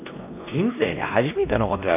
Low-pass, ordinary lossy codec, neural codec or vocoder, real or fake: 3.6 kHz; none; codec, 16 kHz, 1 kbps, X-Codec, WavLM features, trained on Multilingual LibriSpeech; fake